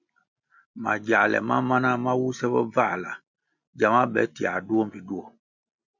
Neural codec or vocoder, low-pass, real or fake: none; 7.2 kHz; real